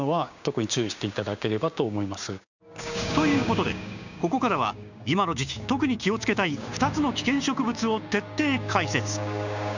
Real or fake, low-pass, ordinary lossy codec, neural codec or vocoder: fake; 7.2 kHz; none; codec, 16 kHz, 6 kbps, DAC